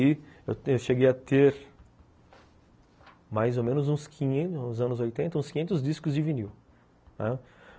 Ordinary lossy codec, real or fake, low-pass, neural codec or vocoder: none; real; none; none